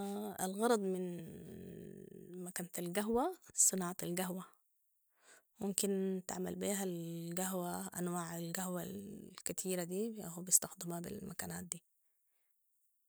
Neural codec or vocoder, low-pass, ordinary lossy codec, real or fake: none; none; none; real